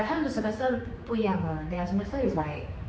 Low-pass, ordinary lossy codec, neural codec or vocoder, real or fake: none; none; codec, 16 kHz, 4 kbps, X-Codec, HuBERT features, trained on general audio; fake